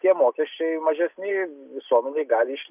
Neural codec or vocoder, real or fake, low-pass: none; real; 3.6 kHz